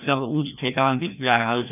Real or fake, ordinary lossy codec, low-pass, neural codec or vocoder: fake; none; 3.6 kHz; codec, 16 kHz, 1 kbps, FreqCodec, larger model